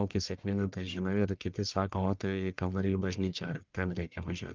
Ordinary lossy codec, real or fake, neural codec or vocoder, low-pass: Opus, 32 kbps; fake; codec, 44.1 kHz, 1.7 kbps, Pupu-Codec; 7.2 kHz